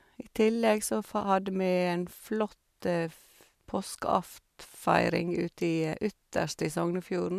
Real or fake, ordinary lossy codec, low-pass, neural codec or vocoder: real; MP3, 96 kbps; 14.4 kHz; none